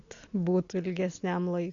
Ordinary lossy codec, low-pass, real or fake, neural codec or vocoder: AAC, 48 kbps; 7.2 kHz; real; none